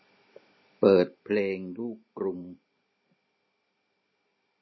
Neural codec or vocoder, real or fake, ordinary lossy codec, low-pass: none; real; MP3, 24 kbps; 7.2 kHz